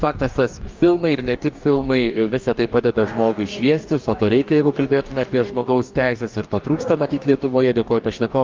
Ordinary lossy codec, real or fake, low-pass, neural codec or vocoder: Opus, 24 kbps; fake; 7.2 kHz; codec, 44.1 kHz, 2.6 kbps, DAC